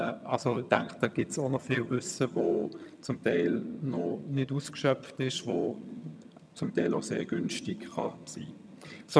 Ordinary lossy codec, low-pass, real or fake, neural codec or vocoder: none; none; fake; vocoder, 22.05 kHz, 80 mel bands, HiFi-GAN